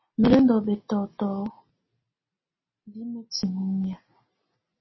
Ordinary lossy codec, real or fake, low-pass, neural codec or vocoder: MP3, 24 kbps; real; 7.2 kHz; none